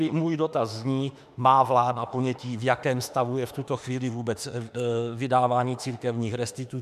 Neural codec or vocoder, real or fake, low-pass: autoencoder, 48 kHz, 32 numbers a frame, DAC-VAE, trained on Japanese speech; fake; 14.4 kHz